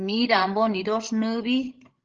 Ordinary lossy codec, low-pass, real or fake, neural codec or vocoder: Opus, 16 kbps; 7.2 kHz; fake; codec, 16 kHz, 16 kbps, FreqCodec, larger model